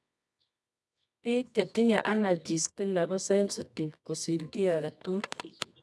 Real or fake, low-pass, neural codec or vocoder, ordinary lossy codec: fake; none; codec, 24 kHz, 0.9 kbps, WavTokenizer, medium music audio release; none